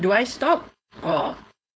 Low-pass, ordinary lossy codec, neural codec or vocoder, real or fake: none; none; codec, 16 kHz, 4.8 kbps, FACodec; fake